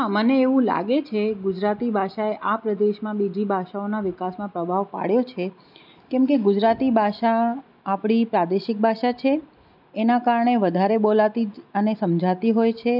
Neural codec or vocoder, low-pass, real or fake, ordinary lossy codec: none; 5.4 kHz; real; none